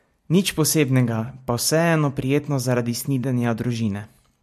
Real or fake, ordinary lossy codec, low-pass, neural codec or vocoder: real; MP3, 64 kbps; 14.4 kHz; none